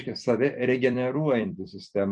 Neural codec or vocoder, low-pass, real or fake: vocoder, 44.1 kHz, 128 mel bands every 256 samples, BigVGAN v2; 9.9 kHz; fake